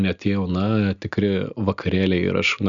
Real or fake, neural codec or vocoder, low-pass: real; none; 7.2 kHz